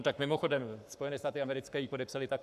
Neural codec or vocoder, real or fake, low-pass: codec, 44.1 kHz, 7.8 kbps, Pupu-Codec; fake; 14.4 kHz